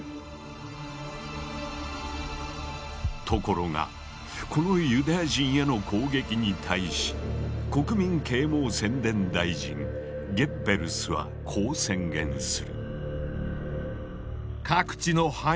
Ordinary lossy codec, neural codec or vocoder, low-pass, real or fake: none; none; none; real